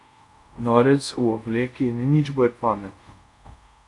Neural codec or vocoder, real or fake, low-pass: codec, 24 kHz, 0.5 kbps, DualCodec; fake; 10.8 kHz